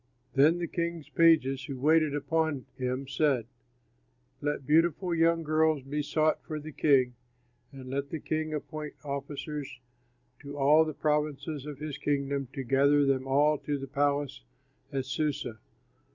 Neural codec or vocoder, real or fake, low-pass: none; real; 7.2 kHz